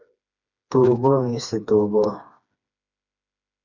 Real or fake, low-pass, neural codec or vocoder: fake; 7.2 kHz; codec, 16 kHz, 2 kbps, FreqCodec, smaller model